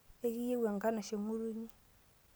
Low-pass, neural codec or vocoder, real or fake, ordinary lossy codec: none; none; real; none